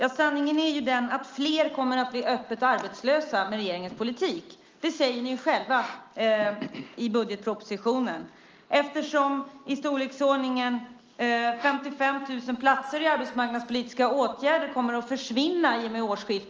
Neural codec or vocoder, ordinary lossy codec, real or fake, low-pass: none; Opus, 24 kbps; real; 7.2 kHz